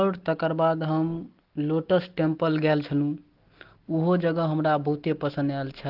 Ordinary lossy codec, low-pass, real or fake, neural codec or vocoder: Opus, 16 kbps; 5.4 kHz; real; none